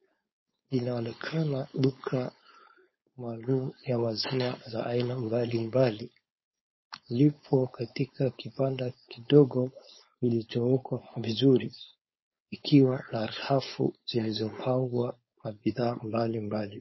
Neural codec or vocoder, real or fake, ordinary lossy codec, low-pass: codec, 16 kHz, 4.8 kbps, FACodec; fake; MP3, 24 kbps; 7.2 kHz